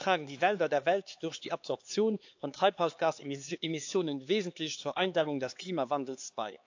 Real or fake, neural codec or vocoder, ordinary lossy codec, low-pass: fake; codec, 16 kHz, 4 kbps, X-Codec, HuBERT features, trained on LibriSpeech; AAC, 48 kbps; 7.2 kHz